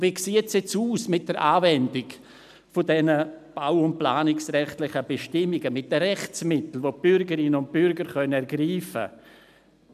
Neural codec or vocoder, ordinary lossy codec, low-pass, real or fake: none; none; 14.4 kHz; real